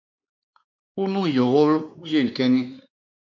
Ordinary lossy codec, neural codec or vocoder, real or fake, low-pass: MP3, 64 kbps; codec, 16 kHz, 2 kbps, X-Codec, WavLM features, trained on Multilingual LibriSpeech; fake; 7.2 kHz